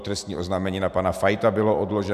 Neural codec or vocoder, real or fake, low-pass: none; real; 14.4 kHz